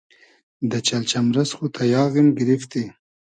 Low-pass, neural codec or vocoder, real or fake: 9.9 kHz; none; real